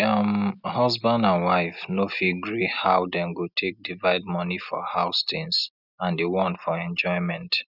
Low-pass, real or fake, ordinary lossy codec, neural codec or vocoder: 5.4 kHz; real; none; none